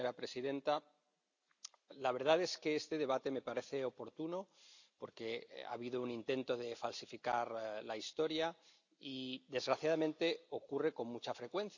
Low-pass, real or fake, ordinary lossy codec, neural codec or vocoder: 7.2 kHz; real; none; none